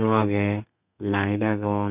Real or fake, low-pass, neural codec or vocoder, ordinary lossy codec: fake; 3.6 kHz; codec, 32 kHz, 1.9 kbps, SNAC; none